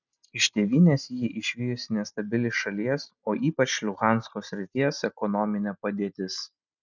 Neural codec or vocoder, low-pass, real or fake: none; 7.2 kHz; real